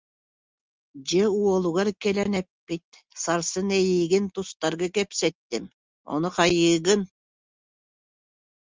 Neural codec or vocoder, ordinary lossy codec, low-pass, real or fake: none; Opus, 32 kbps; 7.2 kHz; real